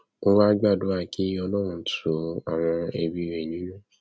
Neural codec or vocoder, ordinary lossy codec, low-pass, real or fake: none; none; none; real